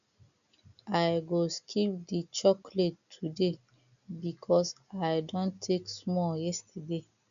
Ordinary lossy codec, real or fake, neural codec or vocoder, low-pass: none; real; none; 7.2 kHz